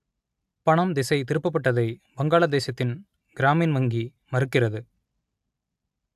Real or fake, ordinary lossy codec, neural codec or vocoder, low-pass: real; AAC, 96 kbps; none; 14.4 kHz